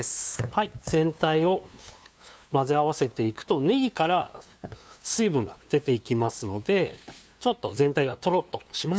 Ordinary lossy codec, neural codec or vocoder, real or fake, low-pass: none; codec, 16 kHz, 2 kbps, FunCodec, trained on LibriTTS, 25 frames a second; fake; none